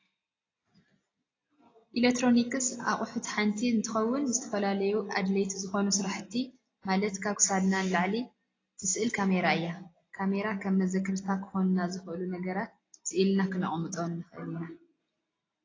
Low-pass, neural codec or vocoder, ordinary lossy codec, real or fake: 7.2 kHz; none; AAC, 32 kbps; real